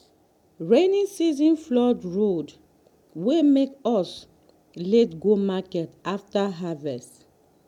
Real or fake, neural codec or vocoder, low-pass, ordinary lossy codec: real; none; 19.8 kHz; none